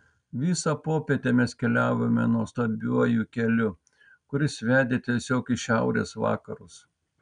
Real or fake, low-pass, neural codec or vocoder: real; 9.9 kHz; none